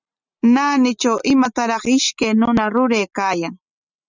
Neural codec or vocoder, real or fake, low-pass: none; real; 7.2 kHz